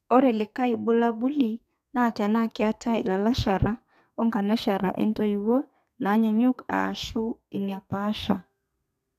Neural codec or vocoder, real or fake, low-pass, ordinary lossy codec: codec, 32 kHz, 1.9 kbps, SNAC; fake; 14.4 kHz; none